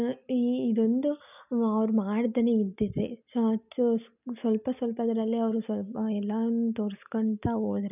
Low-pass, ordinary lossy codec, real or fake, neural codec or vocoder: 3.6 kHz; none; real; none